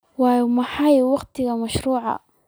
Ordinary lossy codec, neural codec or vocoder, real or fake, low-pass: none; none; real; none